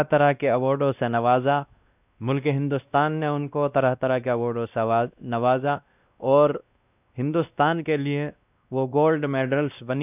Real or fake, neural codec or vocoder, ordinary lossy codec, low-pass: fake; codec, 16 kHz, 1 kbps, X-Codec, WavLM features, trained on Multilingual LibriSpeech; none; 3.6 kHz